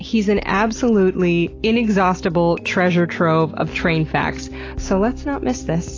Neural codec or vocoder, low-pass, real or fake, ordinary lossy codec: none; 7.2 kHz; real; AAC, 32 kbps